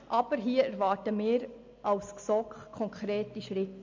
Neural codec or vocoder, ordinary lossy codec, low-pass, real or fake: none; MP3, 48 kbps; 7.2 kHz; real